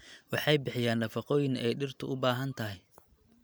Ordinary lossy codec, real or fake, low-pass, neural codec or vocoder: none; real; none; none